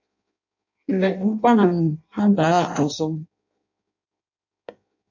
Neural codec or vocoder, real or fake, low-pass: codec, 16 kHz in and 24 kHz out, 0.6 kbps, FireRedTTS-2 codec; fake; 7.2 kHz